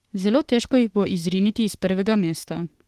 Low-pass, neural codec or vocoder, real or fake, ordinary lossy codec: 14.4 kHz; autoencoder, 48 kHz, 32 numbers a frame, DAC-VAE, trained on Japanese speech; fake; Opus, 16 kbps